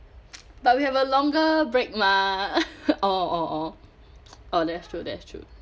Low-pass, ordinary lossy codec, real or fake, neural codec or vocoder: none; none; real; none